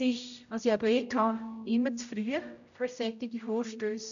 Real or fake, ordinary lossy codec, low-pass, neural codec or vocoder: fake; MP3, 96 kbps; 7.2 kHz; codec, 16 kHz, 0.5 kbps, X-Codec, HuBERT features, trained on general audio